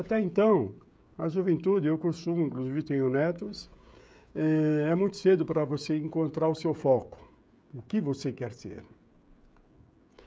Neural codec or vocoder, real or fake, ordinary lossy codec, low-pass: codec, 16 kHz, 16 kbps, FreqCodec, smaller model; fake; none; none